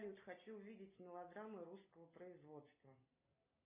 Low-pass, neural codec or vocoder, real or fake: 3.6 kHz; none; real